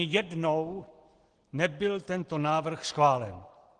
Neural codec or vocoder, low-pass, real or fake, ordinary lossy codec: none; 9.9 kHz; real; Opus, 16 kbps